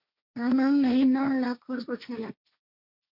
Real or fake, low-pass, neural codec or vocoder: fake; 5.4 kHz; codec, 16 kHz, 1.1 kbps, Voila-Tokenizer